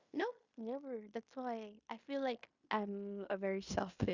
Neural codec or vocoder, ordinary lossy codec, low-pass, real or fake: codec, 16 kHz in and 24 kHz out, 0.9 kbps, LongCat-Audio-Codec, fine tuned four codebook decoder; Opus, 64 kbps; 7.2 kHz; fake